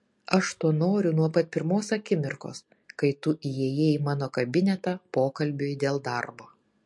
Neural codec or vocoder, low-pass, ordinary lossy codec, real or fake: none; 10.8 kHz; MP3, 48 kbps; real